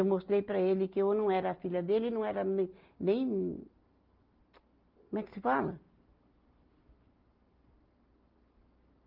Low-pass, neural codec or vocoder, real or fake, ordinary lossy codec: 5.4 kHz; none; real; Opus, 32 kbps